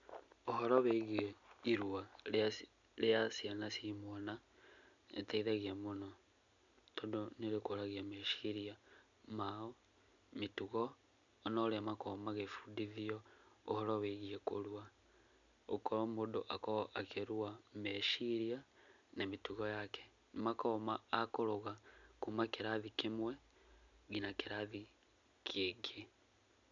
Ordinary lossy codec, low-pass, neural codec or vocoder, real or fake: none; 7.2 kHz; none; real